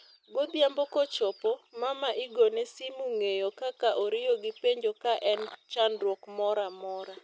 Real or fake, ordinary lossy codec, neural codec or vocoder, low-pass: real; none; none; none